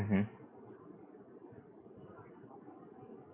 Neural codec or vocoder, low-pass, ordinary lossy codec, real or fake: vocoder, 44.1 kHz, 128 mel bands every 512 samples, BigVGAN v2; 3.6 kHz; Opus, 64 kbps; fake